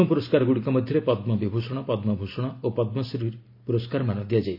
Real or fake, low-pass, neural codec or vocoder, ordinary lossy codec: real; 5.4 kHz; none; MP3, 32 kbps